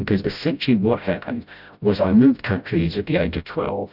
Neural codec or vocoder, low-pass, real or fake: codec, 16 kHz, 0.5 kbps, FreqCodec, smaller model; 5.4 kHz; fake